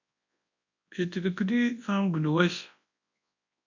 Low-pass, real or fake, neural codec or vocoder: 7.2 kHz; fake; codec, 24 kHz, 0.9 kbps, WavTokenizer, large speech release